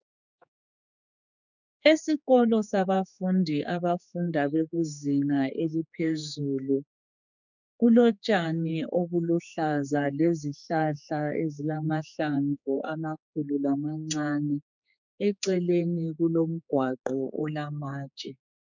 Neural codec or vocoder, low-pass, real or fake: codec, 16 kHz, 4 kbps, X-Codec, HuBERT features, trained on general audio; 7.2 kHz; fake